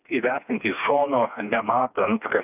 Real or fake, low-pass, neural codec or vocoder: fake; 3.6 kHz; codec, 16 kHz, 2 kbps, FreqCodec, smaller model